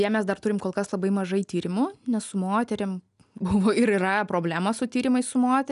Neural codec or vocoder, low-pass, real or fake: none; 10.8 kHz; real